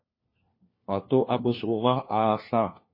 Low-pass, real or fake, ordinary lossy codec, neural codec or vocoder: 5.4 kHz; fake; MP3, 24 kbps; codec, 16 kHz, 1 kbps, FunCodec, trained on LibriTTS, 50 frames a second